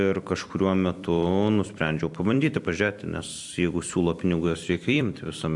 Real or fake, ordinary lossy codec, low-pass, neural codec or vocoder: fake; AAC, 64 kbps; 10.8 kHz; vocoder, 44.1 kHz, 128 mel bands every 256 samples, BigVGAN v2